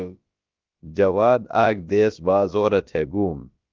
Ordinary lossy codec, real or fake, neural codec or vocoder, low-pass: Opus, 24 kbps; fake; codec, 16 kHz, about 1 kbps, DyCAST, with the encoder's durations; 7.2 kHz